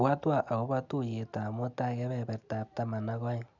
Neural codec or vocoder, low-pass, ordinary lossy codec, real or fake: none; 7.2 kHz; none; real